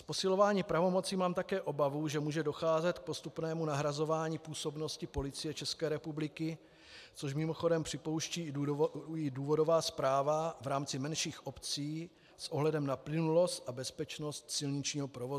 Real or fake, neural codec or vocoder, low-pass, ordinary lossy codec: real; none; 14.4 kHz; AAC, 96 kbps